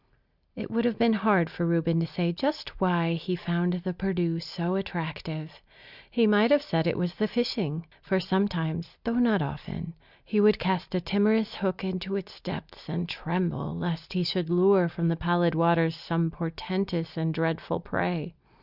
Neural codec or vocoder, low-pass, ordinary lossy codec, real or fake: none; 5.4 kHz; Opus, 64 kbps; real